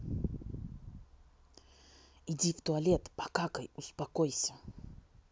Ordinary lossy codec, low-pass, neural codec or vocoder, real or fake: none; none; none; real